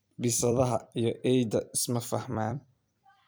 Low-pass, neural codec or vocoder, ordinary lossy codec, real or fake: none; vocoder, 44.1 kHz, 128 mel bands every 256 samples, BigVGAN v2; none; fake